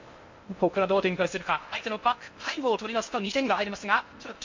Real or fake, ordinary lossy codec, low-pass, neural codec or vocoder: fake; MP3, 48 kbps; 7.2 kHz; codec, 16 kHz in and 24 kHz out, 0.6 kbps, FocalCodec, streaming, 2048 codes